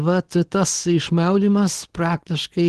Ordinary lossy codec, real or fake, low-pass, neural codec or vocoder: Opus, 16 kbps; fake; 10.8 kHz; codec, 24 kHz, 0.9 kbps, WavTokenizer, medium speech release version 2